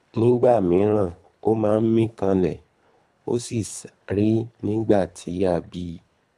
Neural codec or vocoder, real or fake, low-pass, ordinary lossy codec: codec, 24 kHz, 3 kbps, HILCodec; fake; none; none